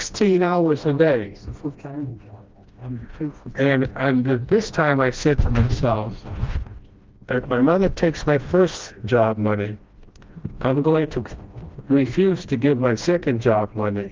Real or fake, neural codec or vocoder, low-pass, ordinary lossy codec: fake; codec, 16 kHz, 1 kbps, FreqCodec, smaller model; 7.2 kHz; Opus, 32 kbps